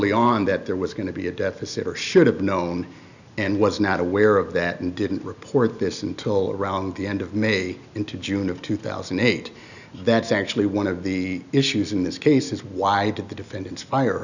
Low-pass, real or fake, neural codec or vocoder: 7.2 kHz; real; none